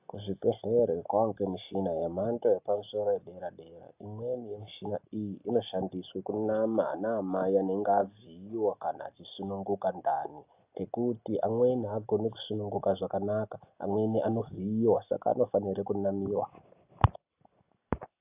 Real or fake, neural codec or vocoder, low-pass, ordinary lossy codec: real; none; 3.6 kHz; AAC, 32 kbps